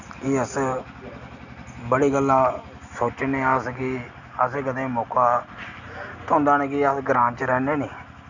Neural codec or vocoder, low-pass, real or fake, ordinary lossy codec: none; 7.2 kHz; real; none